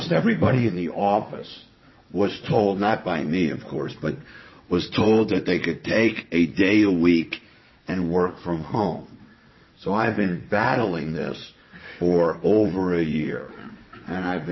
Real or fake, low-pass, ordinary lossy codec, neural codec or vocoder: fake; 7.2 kHz; MP3, 24 kbps; vocoder, 22.05 kHz, 80 mel bands, WaveNeXt